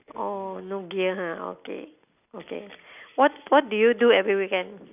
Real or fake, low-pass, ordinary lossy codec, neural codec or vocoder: real; 3.6 kHz; none; none